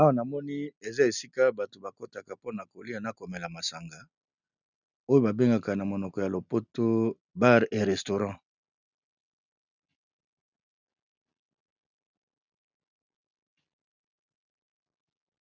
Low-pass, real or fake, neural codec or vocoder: 7.2 kHz; real; none